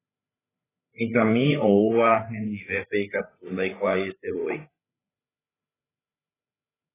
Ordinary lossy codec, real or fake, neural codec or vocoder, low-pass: AAC, 16 kbps; fake; codec, 16 kHz, 8 kbps, FreqCodec, larger model; 3.6 kHz